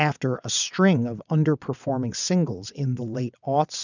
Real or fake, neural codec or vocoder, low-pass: fake; vocoder, 22.05 kHz, 80 mel bands, WaveNeXt; 7.2 kHz